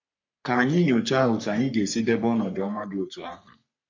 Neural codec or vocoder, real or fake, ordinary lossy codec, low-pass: codec, 44.1 kHz, 3.4 kbps, Pupu-Codec; fake; MP3, 48 kbps; 7.2 kHz